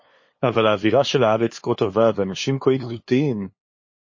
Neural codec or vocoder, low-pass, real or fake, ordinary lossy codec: codec, 16 kHz, 2 kbps, FunCodec, trained on LibriTTS, 25 frames a second; 7.2 kHz; fake; MP3, 32 kbps